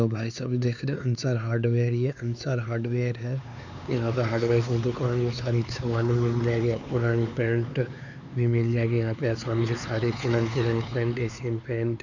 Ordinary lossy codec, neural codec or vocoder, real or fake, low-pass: none; codec, 16 kHz, 4 kbps, X-Codec, HuBERT features, trained on LibriSpeech; fake; 7.2 kHz